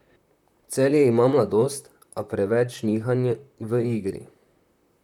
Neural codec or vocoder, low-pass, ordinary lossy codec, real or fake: vocoder, 44.1 kHz, 128 mel bands, Pupu-Vocoder; 19.8 kHz; none; fake